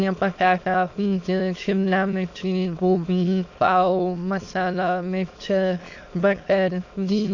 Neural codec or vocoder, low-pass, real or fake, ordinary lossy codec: autoencoder, 22.05 kHz, a latent of 192 numbers a frame, VITS, trained on many speakers; 7.2 kHz; fake; AAC, 48 kbps